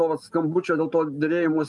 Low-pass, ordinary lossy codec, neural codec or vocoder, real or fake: 10.8 kHz; Opus, 32 kbps; none; real